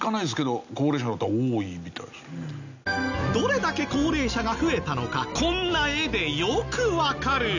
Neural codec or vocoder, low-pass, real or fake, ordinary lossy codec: none; 7.2 kHz; real; none